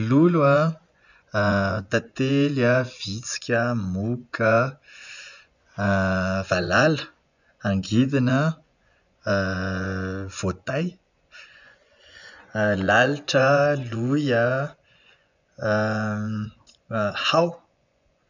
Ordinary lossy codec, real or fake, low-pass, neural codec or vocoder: none; fake; 7.2 kHz; vocoder, 24 kHz, 100 mel bands, Vocos